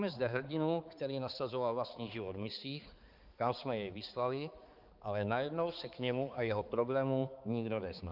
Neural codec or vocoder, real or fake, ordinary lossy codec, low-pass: codec, 16 kHz, 4 kbps, X-Codec, HuBERT features, trained on balanced general audio; fake; Opus, 32 kbps; 5.4 kHz